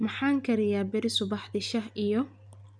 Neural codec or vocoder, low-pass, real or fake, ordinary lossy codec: none; 9.9 kHz; real; none